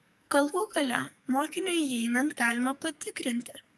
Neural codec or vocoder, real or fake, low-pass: codec, 44.1 kHz, 2.6 kbps, SNAC; fake; 14.4 kHz